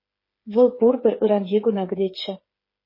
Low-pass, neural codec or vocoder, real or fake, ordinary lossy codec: 5.4 kHz; codec, 16 kHz, 8 kbps, FreqCodec, smaller model; fake; MP3, 24 kbps